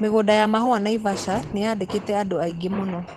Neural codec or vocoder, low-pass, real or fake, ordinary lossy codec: vocoder, 44.1 kHz, 128 mel bands every 512 samples, BigVGAN v2; 19.8 kHz; fake; Opus, 16 kbps